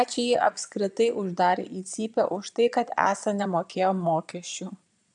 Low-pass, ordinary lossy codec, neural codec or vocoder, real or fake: 9.9 kHz; MP3, 96 kbps; vocoder, 22.05 kHz, 80 mel bands, Vocos; fake